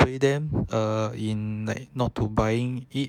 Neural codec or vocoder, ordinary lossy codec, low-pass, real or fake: autoencoder, 48 kHz, 128 numbers a frame, DAC-VAE, trained on Japanese speech; none; 19.8 kHz; fake